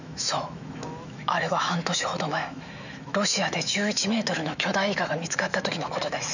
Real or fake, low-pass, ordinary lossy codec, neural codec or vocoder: fake; 7.2 kHz; none; autoencoder, 48 kHz, 128 numbers a frame, DAC-VAE, trained on Japanese speech